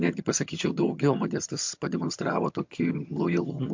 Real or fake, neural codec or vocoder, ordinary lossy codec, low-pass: fake; vocoder, 22.05 kHz, 80 mel bands, HiFi-GAN; MP3, 48 kbps; 7.2 kHz